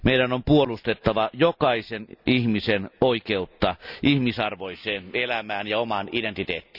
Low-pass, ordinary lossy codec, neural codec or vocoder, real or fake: 5.4 kHz; none; none; real